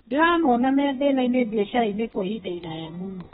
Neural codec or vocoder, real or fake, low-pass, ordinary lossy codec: codec, 32 kHz, 1.9 kbps, SNAC; fake; 14.4 kHz; AAC, 16 kbps